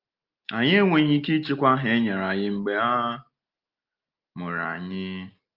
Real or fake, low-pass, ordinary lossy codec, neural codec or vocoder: real; 5.4 kHz; Opus, 24 kbps; none